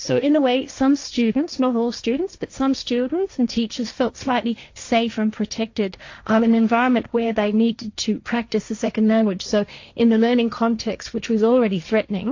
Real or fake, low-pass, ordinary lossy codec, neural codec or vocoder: fake; 7.2 kHz; AAC, 48 kbps; codec, 16 kHz, 1.1 kbps, Voila-Tokenizer